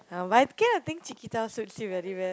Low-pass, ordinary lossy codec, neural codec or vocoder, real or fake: none; none; none; real